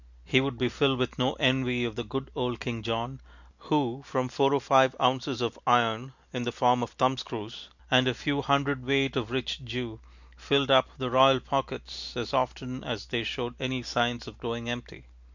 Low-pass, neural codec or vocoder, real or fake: 7.2 kHz; none; real